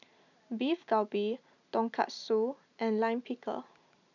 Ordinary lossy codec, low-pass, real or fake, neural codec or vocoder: none; 7.2 kHz; real; none